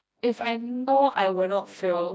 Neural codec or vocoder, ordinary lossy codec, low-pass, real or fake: codec, 16 kHz, 1 kbps, FreqCodec, smaller model; none; none; fake